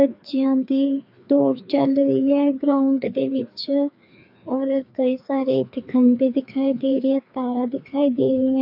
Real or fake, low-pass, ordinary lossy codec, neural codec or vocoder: fake; 5.4 kHz; AAC, 48 kbps; codec, 16 kHz, 2 kbps, FreqCodec, larger model